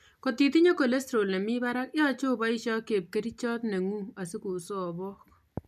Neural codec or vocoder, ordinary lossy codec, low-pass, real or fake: none; none; 14.4 kHz; real